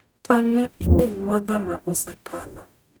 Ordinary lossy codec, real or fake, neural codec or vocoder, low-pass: none; fake; codec, 44.1 kHz, 0.9 kbps, DAC; none